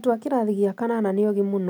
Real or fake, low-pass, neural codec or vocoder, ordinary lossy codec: real; none; none; none